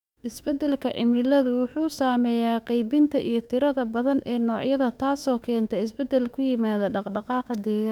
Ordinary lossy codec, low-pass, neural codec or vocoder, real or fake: none; 19.8 kHz; autoencoder, 48 kHz, 32 numbers a frame, DAC-VAE, trained on Japanese speech; fake